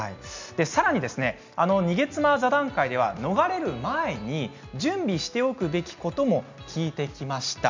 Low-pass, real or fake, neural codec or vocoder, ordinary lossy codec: 7.2 kHz; real; none; MP3, 64 kbps